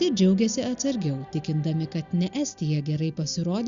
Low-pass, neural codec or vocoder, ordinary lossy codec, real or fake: 7.2 kHz; none; Opus, 64 kbps; real